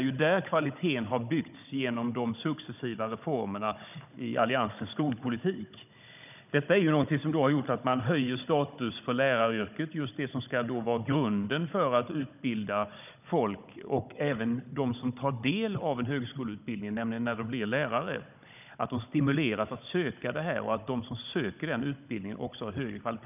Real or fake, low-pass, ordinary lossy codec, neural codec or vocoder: fake; 3.6 kHz; none; codec, 16 kHz, 16 kbps, FunCodec, trained on Chinese and English, 50 frames a second